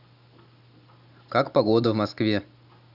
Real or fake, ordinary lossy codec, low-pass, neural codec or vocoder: real; none; 5.4 kHz; none